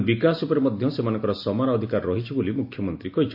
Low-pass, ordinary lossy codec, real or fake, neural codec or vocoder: 5.4 kHz; MP3, 32 kbps; real; none